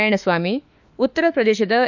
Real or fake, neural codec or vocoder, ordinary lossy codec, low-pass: fake; autoencoder, 48 kHz, 32 numbers a frame, DAC-VAE, trained on Japanese speech; none; 7.2 kHz